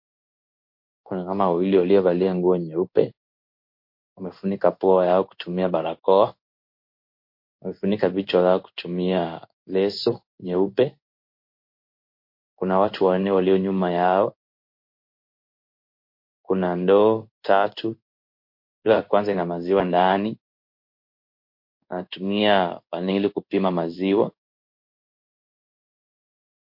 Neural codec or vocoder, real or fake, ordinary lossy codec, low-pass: codec, 16 kHz in and 24 kHz out, 1 kbps, XY-Tokenizer; fake; MP3, 32 kbps; 5.4 kHz